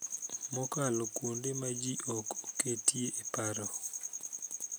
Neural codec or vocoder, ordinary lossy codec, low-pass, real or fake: none; none; none; real